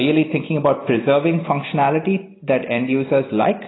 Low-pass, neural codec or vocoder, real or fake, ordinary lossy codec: 7.2 kHz; none; real; AAC, 16 kbps